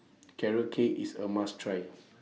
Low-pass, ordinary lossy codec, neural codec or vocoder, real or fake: none; none; none; real